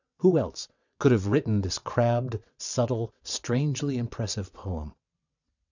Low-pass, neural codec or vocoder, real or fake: 7.2 kHz; vocoder, 22.05 kHz, 80 mel bands, WaveNeXt; fake